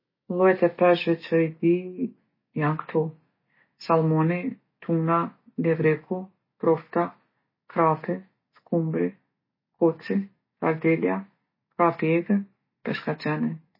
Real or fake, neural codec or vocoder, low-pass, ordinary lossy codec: real; none; 5.4 kHz; MP3, 24 kbps